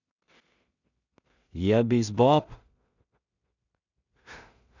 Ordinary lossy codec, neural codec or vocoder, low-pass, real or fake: none; codec, 16 kHz in and 24 kHz out, 0.4 kbps, LongCat-Audio-Codec, two codebook decoder; 7.2 kHz; fake